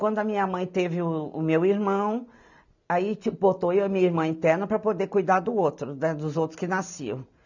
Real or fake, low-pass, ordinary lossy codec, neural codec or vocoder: real; 7.2 kHz; none; none